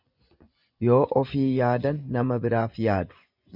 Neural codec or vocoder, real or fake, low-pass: vocoder, 24 kHz, 100 mel bands, Vocos; fake; 5.4 kHz